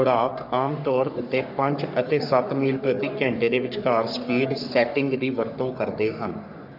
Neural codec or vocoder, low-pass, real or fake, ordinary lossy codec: codec, 44.1 kHz, 3.4 kbps, Pupu-Codec; 5.4 kHz; fake; none